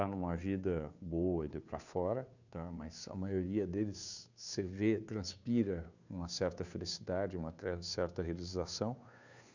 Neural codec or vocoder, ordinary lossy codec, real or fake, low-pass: codec, 16 kHz, 2 kbps, FunCodec, trained on Chinese and English, 25 frames a second; none; fake; 7.2 kHz